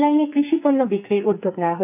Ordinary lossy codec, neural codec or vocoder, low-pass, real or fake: AAC, 32 kbps; codec, 44.1 kHz, 2.6 kbps, SNAC; 3.6 kHz; fake